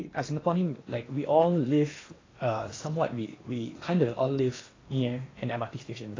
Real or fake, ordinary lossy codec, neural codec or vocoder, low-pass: fake; AAC, 32 kbps; codec, 16 kHz in and 24 kHz out, 0.8 kbps, FocalCodec, streaming, 65536 codes; 7.2 kHz